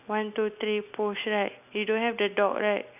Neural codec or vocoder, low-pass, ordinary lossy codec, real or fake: none; 3.6 kHz; none; real